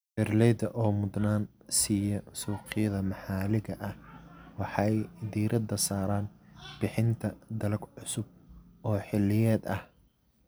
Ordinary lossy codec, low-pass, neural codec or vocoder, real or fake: none; none; none; real